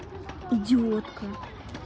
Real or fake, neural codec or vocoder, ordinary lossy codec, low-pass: real; none; none; none